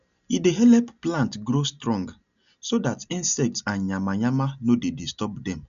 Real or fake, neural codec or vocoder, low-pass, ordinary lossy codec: real; none; 7.2 kHz; none